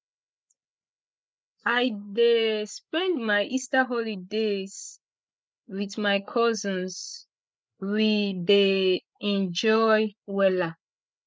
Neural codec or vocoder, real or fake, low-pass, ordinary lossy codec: codec, 16 kHz, 16 kbps, FreqCodec, larger model; fake; none; none